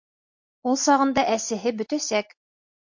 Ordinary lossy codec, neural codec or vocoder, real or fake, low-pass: MP3, 48 kbps; none; real; 7.2 kHz